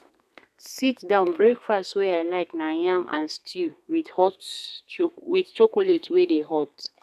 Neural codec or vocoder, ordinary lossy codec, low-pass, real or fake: codec, 32 kHz, 1.9 kbps, SNAC; none; 14.4 kHz; fake